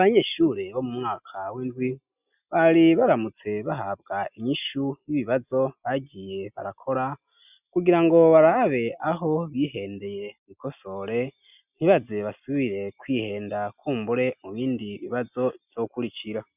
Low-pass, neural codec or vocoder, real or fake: 3.6 kHz; none; real